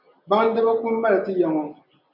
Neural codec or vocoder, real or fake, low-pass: none; real; 5.4 kHz